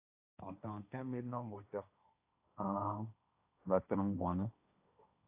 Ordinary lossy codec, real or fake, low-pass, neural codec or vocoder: AAC, 32 kbps; fake; 3.6 kHz; codec, 16 kHz, 1.1 kbps, Voila-Tokenizer